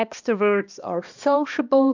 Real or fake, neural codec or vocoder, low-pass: fake; codec, 16 kHz, 1 kbps, X-Codec, HuBERT features, trained on balanced general audio; 7.2 kHz